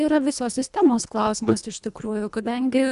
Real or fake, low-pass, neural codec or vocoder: fake; 10.8 kHz; codec, 24 kHz, 1.5 kbps, HILCodec